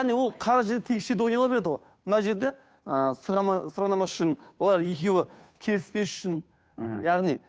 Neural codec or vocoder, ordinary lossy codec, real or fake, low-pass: codec, 16 kHz, 2 kbps, FunCodec, trained on Chinese and English, 25 frames a second; none; fake; none